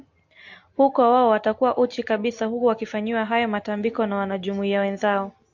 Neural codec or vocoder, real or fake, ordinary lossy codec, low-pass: none; real; AAC, 48 kbps; 7.2 kHz